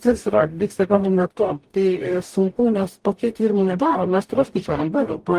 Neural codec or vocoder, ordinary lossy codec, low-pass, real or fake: codec, 44.1 kHz, 0.9 kbps, DAC; Opus, 24 kbps; 14.4 kHz; fake